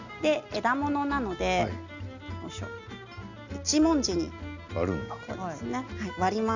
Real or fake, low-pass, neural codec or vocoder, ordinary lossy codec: real; 7.2 kHz; none; none